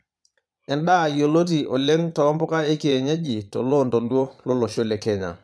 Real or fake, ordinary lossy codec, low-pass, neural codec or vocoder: fake; none; none; vocoder, 22.05 kHz, 80 mel bands, Vocos